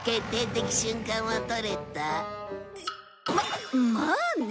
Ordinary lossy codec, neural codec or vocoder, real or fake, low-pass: none; none; real; none